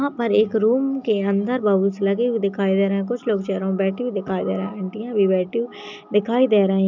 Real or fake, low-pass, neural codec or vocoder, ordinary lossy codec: real; 7.2 kHz; none; none